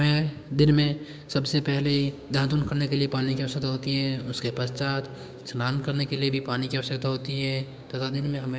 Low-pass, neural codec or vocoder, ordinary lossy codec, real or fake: none; codec, 16 kHz, 6 kbps, DAC; none; fake